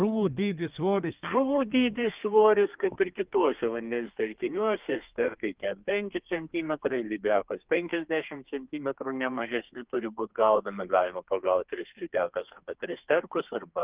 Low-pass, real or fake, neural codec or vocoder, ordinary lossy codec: 3.6 kHz; fake; codec, 32 kHz, 1.9 kbps, SNAC; Opus, 32 kbps